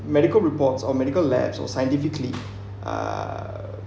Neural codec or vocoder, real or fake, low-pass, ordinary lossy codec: none; real; none; none